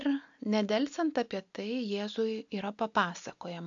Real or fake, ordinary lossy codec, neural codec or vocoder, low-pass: real; AAC, 64 kbps; none; 7.2 kHz